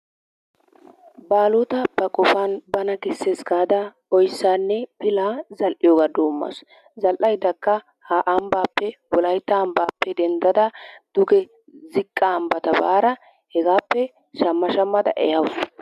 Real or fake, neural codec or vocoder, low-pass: real; none; 14.4 kHz